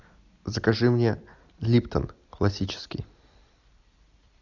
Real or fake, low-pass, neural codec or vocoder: real; 7.2 kHz; none